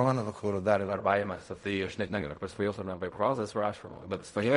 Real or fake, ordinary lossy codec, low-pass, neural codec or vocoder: fake; MP3, 48 kbps; 10.8 kHz; codec, 16 kHz in and 24 kHz out, 0.4 kbps, LongCat-Audio-Codec, fine tuned four codebook decoder